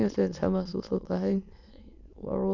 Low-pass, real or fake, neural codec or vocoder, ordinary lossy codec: 7.2 kHz; fake; autoencoder, 22.05 kHz, a latent of 192 numbers a frame, VITS, trained on many speakers; Opus, 64 kbps